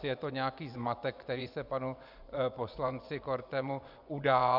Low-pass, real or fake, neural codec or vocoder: 5.4 kHz; fake; vocoder, 24 kHz, 100 mel bands, Vocos